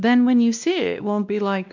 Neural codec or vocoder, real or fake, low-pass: codec, 16 kHz, 1 kbps, X-Codec, WavLM features, trained on Multilingual LibriSpeech; fake; 7.2 kHz